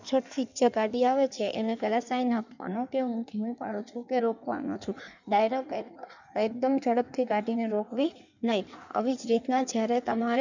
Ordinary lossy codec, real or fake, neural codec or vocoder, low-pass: none; fake; codec, 16 kHz in and 24 kHz out, 1.1 kbps, FireRedTTS-2 codec; 7.2 kHz